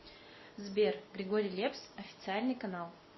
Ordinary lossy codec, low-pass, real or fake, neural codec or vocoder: MP3, 24 kbps; 7.2 kHz; real; none